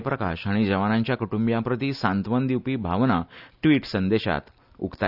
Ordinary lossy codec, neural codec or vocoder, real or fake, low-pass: none; none; real; 5.4 kHz